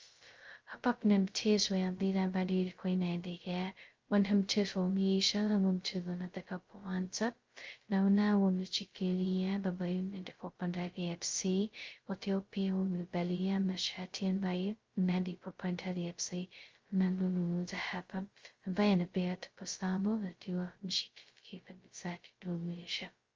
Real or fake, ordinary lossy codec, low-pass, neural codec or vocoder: fake; Opus, 32 kbps; 7.2 kHz; codec, 16 kHz, 0.2 kbps, FocalCodec